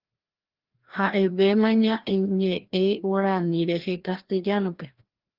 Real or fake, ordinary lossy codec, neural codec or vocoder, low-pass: fake; Opus, 16 kbps; codec, 16 kHz, 1 kbps, FreqCodec, larger model; 5.4 kHz